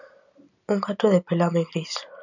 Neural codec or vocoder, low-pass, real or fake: none; 7.2 kHz; real